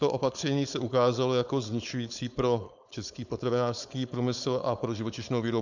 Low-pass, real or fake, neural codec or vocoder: 7.2 kHz; fake; codec, 16 kHz, 4.8 kbps, FACodec